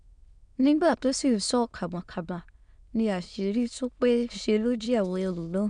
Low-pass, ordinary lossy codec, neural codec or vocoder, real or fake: 9.9 kHz; none; autoencoder, 22.05 kHz, a latent of 192 numbers a frame, VITS, trained on many speakers; fake